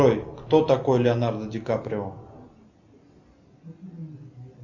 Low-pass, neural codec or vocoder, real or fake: 7.2 kHz; none; real